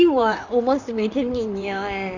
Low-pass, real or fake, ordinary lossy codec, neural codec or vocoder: 7.2 kHz; fake; Opus, 64 kbps; vocoder, 44.1 kHz, 128 mel bands, Pupu-Vocoder